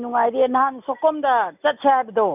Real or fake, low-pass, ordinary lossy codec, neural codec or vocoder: real; 3.6 kHz; none; none